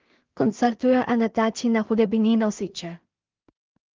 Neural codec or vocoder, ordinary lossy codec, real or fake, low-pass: codec, 16 kHz in and 24 kHz out, 0.4 kbps, LongCat-Audio-Codec, two codebook decoder; Opus, 32 kbps; fake; 7.2 kHz